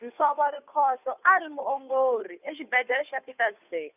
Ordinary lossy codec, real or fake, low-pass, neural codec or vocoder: none; fake; 3.6 kHz; codec, 16 kHz, 2 kbps, FunCodec, trained on Chinese and English, 25 frames a second